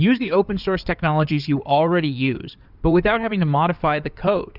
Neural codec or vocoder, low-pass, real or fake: codec, 16 kHz in and 24 kHz out, 2.2 kbps, FireRedTTS-2 codec; 5.4 kHz; fake